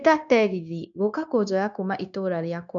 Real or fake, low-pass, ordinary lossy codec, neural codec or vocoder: fake; 7.2 kHz; none; codec, 16 kHz, 0.9 kbps, LongCat-Audio-Codec